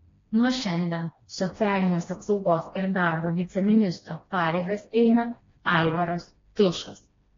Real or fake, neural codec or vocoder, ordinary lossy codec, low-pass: fake; codec, 16 kHz, 1 kbps, FreqCodec, smaller model; AAC, 32 kbps; 7.2 kHz